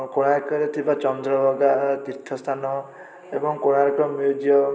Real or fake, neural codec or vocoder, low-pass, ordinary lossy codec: real; none; none; none